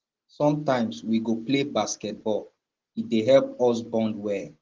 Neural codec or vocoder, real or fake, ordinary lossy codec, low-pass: none; real; Opus, 16 kbps; 7.2 kHz